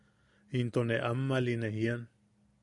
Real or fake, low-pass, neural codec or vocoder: real; 10.8 kHz; none